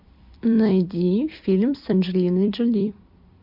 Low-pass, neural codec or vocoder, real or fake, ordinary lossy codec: 5.4 kHz; vocoder, 44.1 kHz, 128 mel bands every 256 samples, BigVGAN v2; fake; MP3, 48 kbps